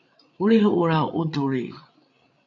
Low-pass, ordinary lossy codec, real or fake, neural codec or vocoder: 7.2 kHz; AAC, 64 kbps; fake; codec, 16 kHz, 8 kbps, FreqCodec, larger model